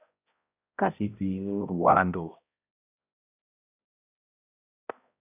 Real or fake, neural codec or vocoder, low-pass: fake; codec, 16 kHz, 0.5 kbps, X-Codec, HuBERT features, trained on balanced general audio; 3.6 kHz